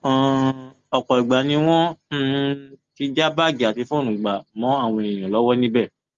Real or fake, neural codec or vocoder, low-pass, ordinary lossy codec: real; none; 7.2 kHz; Opus, 32 kbps